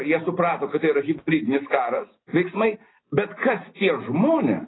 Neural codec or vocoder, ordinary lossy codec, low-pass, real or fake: none; AAC, 16 kbps; 7.2 kHz; real